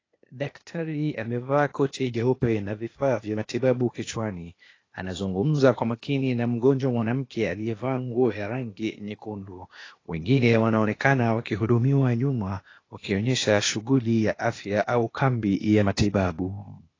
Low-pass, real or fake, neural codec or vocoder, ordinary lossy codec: 7.2 kHz; fake; codec, 16 kHz, 0.8 kbps, ZipCodec; AAC, 32 kbps